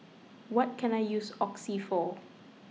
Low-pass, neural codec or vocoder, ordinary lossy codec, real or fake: none; none; none; real